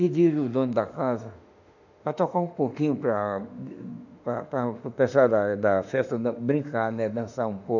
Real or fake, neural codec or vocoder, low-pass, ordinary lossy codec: fake; autoencoder, 48 kHz, 32 numbers a frame, DAC-VAE, trained on Japanese speech; 7.2 kHz; none